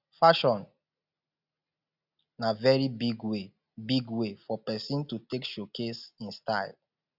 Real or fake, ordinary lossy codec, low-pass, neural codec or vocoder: real; none; 5.4 kHz; none